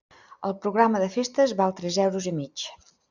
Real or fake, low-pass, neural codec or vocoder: real; 7.2 kHz; none